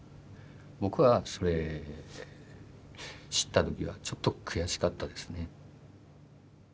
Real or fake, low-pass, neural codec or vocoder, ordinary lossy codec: real; none; none; none